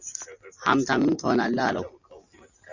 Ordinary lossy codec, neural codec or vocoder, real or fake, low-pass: Opus, 64 kbps; none; real; 7.2 kHz